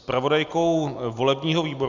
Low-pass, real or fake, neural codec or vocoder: 7.2 kHz; real; none